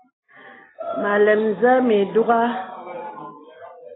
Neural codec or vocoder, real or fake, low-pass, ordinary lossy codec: none; real; 7.2 kHz; AAC, 16 kbps